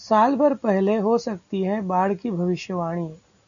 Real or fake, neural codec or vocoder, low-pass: real; none; 7.2 kHz